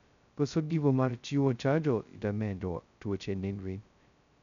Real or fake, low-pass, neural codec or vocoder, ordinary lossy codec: fake; 7.2 kHz; codec, 16 kHz, 0.2 kbps, FocalCodec; none